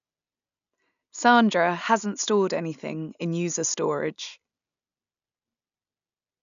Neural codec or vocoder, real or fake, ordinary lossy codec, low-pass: none; real; none; 7.2 kHz